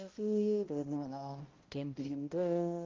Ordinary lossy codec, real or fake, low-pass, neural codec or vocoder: Opus, 32 kbps; fake; 7.2 kHz; codec, 16 kHz, 0.5 kbps, X-Codec, HuBERT features, trained on balanced general audio